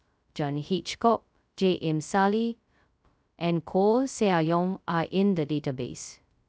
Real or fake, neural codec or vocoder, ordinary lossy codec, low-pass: fake; codec, 16 kHz, 0.2 kbps, FocalCodec; none; none